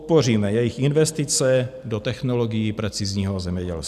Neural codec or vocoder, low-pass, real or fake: none; 14.4 kHz; real